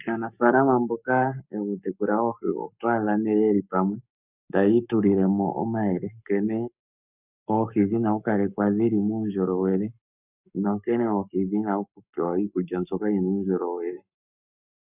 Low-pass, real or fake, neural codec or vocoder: 3.6 kHz; fake; codec, 44.1 kHz, 7.8 kbps, Pupu-Codec